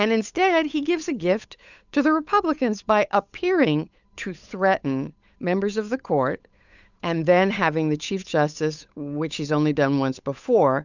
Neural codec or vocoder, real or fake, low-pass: codec, 16 kHz, 8 kbps, FunCodec, trained on Chinese and English, 25 frames a second; fake; 7.2 kHz